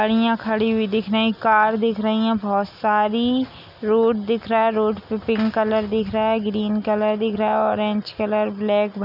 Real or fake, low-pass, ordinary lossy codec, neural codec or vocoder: real; 5.4 kHz; none; none